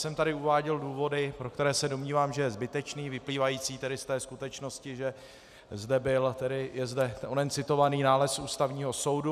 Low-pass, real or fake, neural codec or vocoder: 14.4 kHz; real; none